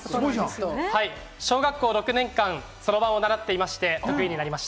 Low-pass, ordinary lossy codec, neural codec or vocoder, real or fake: none; none; none; real